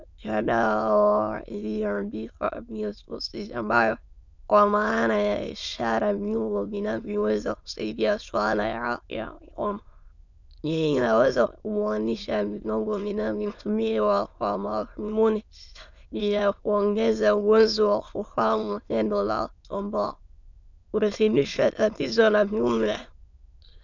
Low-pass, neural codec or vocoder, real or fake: 7.2 kHz; autoencoder, 22.05 kHz, a latent of 192 numbers a frame, VITS, trained on many speakers; fake